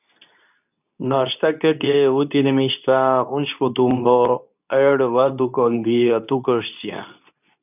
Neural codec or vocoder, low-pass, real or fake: codec, 24 kHz, 0.9 kbps, WavTokenizer, medium speech release version 2; 3.6 kHz; fake